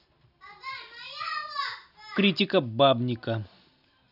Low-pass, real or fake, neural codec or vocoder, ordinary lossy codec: 5.4 kHz; real; none; none